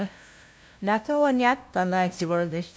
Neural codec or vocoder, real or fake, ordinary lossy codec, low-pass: codec, 16 kHz, 0.5 kbps, FunCodec, trained on LibriTTS, 25 frames a second; fake; none; none